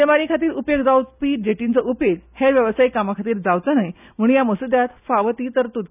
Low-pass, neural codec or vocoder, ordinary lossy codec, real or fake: 3.6 kHz; none; none; real